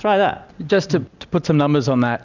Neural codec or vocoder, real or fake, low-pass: none; real; 7.2 kHz